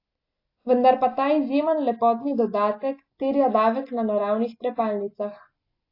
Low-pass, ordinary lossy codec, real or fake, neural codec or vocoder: 5.4 kHz; AAC, 32 kbps; real; none